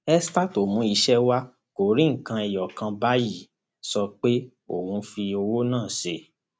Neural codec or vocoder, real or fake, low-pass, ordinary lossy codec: none; real; none; none